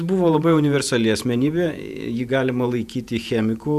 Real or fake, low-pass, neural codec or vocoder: fake; 14.4 kHz; vocoder, 48 kHz, 128 mel bands, Vocos